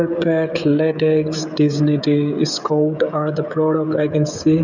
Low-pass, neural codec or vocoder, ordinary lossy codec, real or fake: 7.2 kHz; codec, 16 kHz, 16 kbps, FreqCodec, smaller model; none; fake